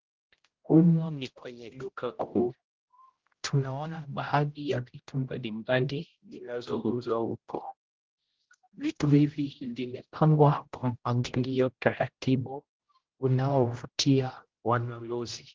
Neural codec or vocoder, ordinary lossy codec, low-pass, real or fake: codec, 16 kHz, 0.5 kbps, X-Codec, HuBERT features, trained on general audio; Opus, 16 kbps; 7.2 kHz; fake